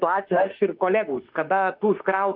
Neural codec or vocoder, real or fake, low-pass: autoencoder, 48 kHz, 32 numbers a frame, DAC-VAE, trained on Japanese speech; fake; 5.4 kHz